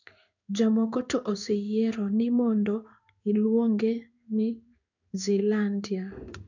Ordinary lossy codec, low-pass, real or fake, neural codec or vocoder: none; 7.2 kHz; fake; codec, 16 kHz in and 24 kHz out, 1 kbps, XY-Tokenizer